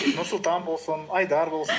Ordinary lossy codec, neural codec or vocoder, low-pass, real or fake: none; none; none; real